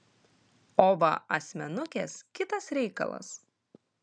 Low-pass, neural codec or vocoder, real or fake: 9.9 kHz; none; real